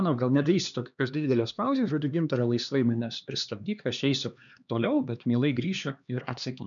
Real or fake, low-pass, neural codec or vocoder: fake; 7.2 kHz; codec, 16 kHz, 2 kbps, X-Codec, HuBERT features, trained on LibriSpeech